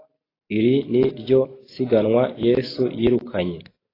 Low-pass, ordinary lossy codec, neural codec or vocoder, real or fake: 5.4 kHz; AAC, 24 kbps; none; real